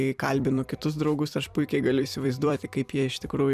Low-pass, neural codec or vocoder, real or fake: 14.4 kHz; vocoder, 44.1 kHz, 128 mel bands every 256 samples, BigVGAN v2; fake